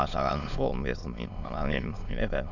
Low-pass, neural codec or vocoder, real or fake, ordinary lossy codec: 7.2 kHz; autoencoder, 22.05 kHz, a latent of 192 numbers a frame, VITS, trained on many speakers; fake; none